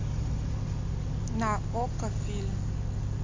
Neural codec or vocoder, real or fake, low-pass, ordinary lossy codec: none; real; 7.2 kHz; MP3, 48 kbps